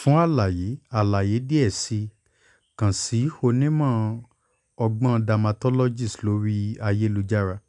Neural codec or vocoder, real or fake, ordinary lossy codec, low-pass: none; real; none; 10.8 kHz